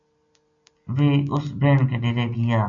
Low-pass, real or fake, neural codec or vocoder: 7.2 kHz; real; none